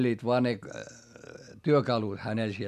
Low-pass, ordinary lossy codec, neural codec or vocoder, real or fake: 14.4 kHz; none; none; real